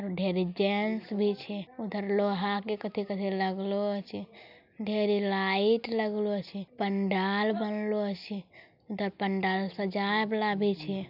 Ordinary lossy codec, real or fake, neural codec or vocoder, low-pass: none; real; none; 5.4 kHz